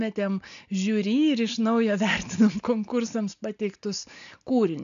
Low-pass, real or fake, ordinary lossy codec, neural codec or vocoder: 7.2 kHz; real; AAC, 96 kbps; none